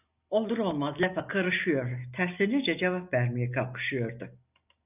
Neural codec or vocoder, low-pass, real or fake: none; 3.6 kHz; real